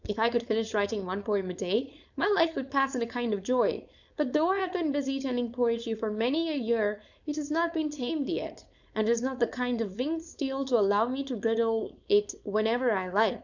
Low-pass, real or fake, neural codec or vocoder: 7.2 kHz; fake; codec, 16 kHz, 4.8 kbps, FACodec